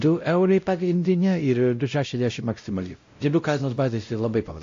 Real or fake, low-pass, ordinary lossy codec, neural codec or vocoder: fake; 7.2 kHz; AAC, 48 kbps; codec, 16 kHz, 0.5 kbps, X-Codec, WavLM features, trained on Multilingual LibriSpeech